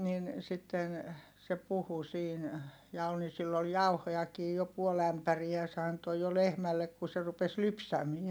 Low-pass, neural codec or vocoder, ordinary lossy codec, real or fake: none; none; none; real